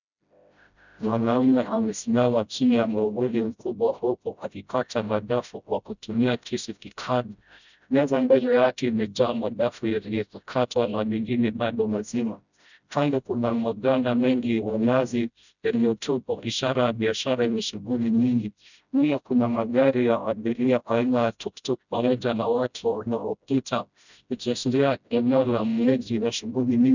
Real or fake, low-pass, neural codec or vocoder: fake; 7.2 kHz; codec, 16 kHz, 0.5 kbps, FreqCodec, smaller model